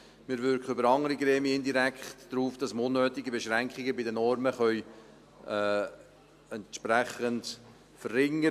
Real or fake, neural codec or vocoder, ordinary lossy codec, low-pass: real; none; AAC, 96 kbps; 14.4 kHz